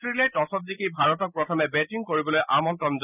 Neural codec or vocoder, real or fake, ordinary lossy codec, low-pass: none; real; none; 3.6 kHz